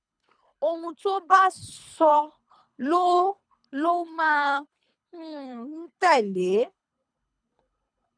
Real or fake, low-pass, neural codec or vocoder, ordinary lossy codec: fake; 9.9 kHz; codec, 24 kHz, 3 kbps, HILCodec; none